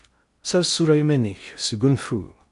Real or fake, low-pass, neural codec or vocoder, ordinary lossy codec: fake; 10.8 kHz; codec, 16 kHz in and 24 kHz out, 0.6 kbps, FocalCodec, streaming, 4096 codes; MP3, 64 kbps